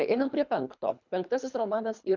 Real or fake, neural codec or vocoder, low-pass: fake; codec, 24 kHz, 3 kbps, HILCodec; 7.2 kHz